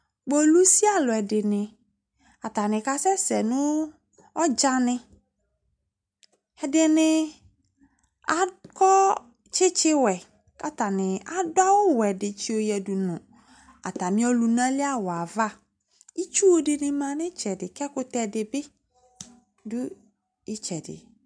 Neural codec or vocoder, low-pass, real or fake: none; 9.9 kHz; real